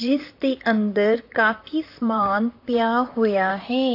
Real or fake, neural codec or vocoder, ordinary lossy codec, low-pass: fake; vocoder, 44.1 kHz, 128 mel bands, Pupu-Vocoder; AAC, 32 kbps; 5.4 kHz